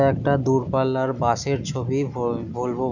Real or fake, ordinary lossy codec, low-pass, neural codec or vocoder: real; none; 7.2 kHz; none